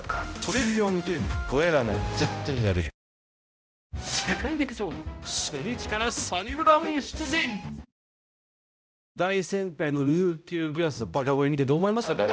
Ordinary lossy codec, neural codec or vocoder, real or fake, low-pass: none; codec, 16 kHz, 0.5 kbps, X-Codec, HuBERT features, trained on balanced general audio; fake; none